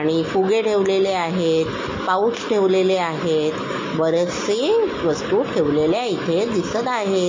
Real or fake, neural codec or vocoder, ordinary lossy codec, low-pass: real; none; MP3, 32 kbps; 7.2 kHz